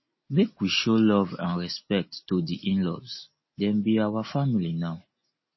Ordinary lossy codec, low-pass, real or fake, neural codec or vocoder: MP3, 24 kbps; 7.2 kHz; real; none